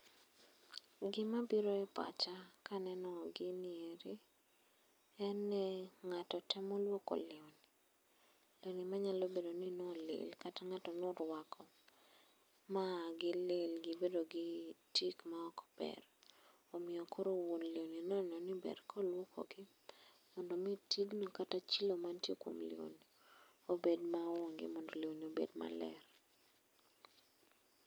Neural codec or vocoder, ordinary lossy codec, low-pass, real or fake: none; none; none; real